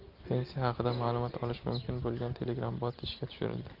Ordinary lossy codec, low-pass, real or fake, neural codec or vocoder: Opus, 24 kbps; 5.4 kHz; real; none